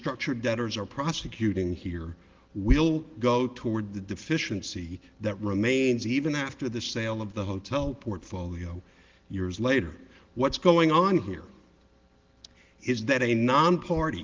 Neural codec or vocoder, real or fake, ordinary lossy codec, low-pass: none; real; Opus, 16 kbps; 7.2 kHz